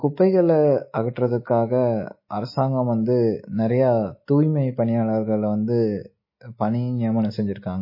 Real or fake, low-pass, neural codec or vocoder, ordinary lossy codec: real; 5.4 kHz; none; MP3, 24 kbps